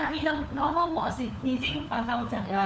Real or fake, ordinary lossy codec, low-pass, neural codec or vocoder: fake; none; none; codec, 16 kHz, 4 kbps, FunCodec, trained on LibriTTS, 50 frames a second